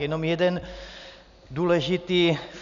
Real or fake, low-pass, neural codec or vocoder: real; 7.2 kHz; none